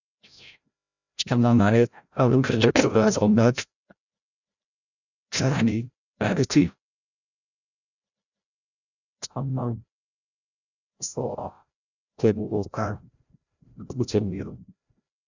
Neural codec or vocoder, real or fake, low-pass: codec, 16 kHz, 0.5 kbps, FreqCodec, larger model; fake; 7.2 kHz